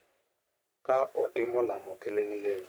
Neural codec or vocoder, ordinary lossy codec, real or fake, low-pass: codec, 44.1 kHz, 3.4 kbps, Pupu-Codec; none; fake; none